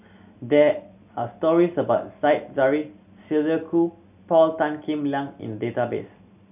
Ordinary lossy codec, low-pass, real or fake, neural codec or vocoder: none; 3.6 kHz; real; none